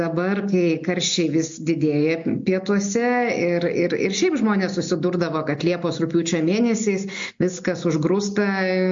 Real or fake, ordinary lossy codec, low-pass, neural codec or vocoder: real; MP3, 48 kbps; 7.2 kHz; none